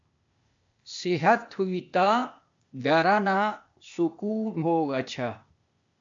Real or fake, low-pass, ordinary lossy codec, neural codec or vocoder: fake; 7.2 kHz; AAC, 64 kbps; codec, 16 kHz, 0.8 kbps, ZipCodec